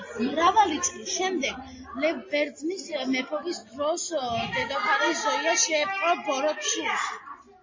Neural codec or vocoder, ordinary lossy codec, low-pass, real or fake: none; MP3, 32 kbps; 7.2 kHz; real